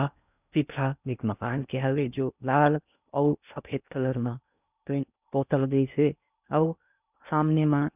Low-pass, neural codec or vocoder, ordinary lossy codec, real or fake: 3.6 kHz; codec, 16 kHz in and 24 kHz out, 0.6 kbps, FocalCodec, streaming, 2048 codes; none; fake